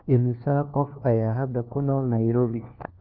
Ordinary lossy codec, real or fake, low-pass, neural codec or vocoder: Opus, 32 kbps; fake; 5.4 kHz; codec, 16 kHz, 1 kbps, FunCodec, trained on LibriTTS, 50 frames a second